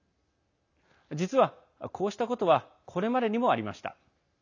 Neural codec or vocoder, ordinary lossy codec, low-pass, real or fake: none; none; 7.2 kHz; real